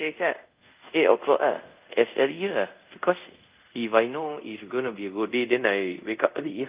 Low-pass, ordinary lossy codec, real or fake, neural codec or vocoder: 3.6 kHz; Opus, 24 kbps; fake; codec, 24 kHz, 0.5 kbps, DualCodec